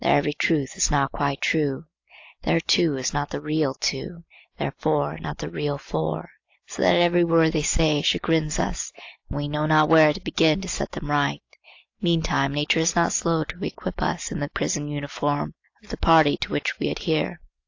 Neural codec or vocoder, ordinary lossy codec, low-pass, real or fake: none; AAC, 48 kbps; 7.2 kHz; real